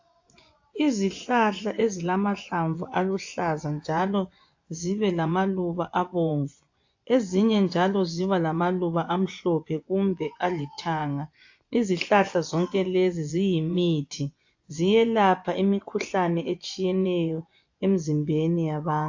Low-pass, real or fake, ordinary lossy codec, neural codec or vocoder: 7.2 kHz; fake; AAC, 48 kbps; vocoder, 24 kHz, 100 mel bands, Vocos